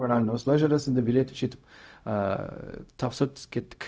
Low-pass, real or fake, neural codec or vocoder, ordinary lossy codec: none; fake; codec, 16 kHz, 0.4 kbps, LongCat-Audio-Codec; none